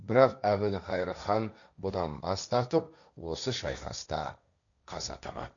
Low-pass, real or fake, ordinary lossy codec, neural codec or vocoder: 7.2 kHz; fake; none; codec, 16 kHz, 1.1 kbps, Voila-Tokenizer